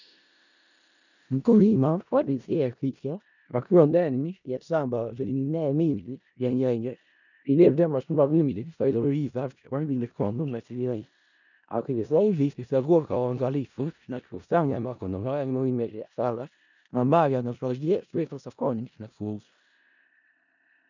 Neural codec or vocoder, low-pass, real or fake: codec, 16 kHz in and 24 kHz out, 0.4 kbps, LongCat-Audio-Codec, four codebook decoder; 7.2 kHz; fake